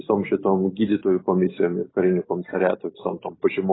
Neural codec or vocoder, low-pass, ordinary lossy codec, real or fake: none; 7.2 kHz; AAC, 16 kbps; real